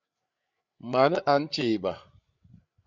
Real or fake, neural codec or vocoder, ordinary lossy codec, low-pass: fake; codec, 16 kHz, 8 kbps, FreqCodec, larger model; Opus, 64 kbps; 7.2 kHz